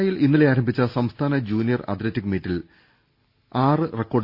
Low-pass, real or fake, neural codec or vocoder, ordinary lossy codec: 5.4 kHz; real; none; Opus, 64 kbps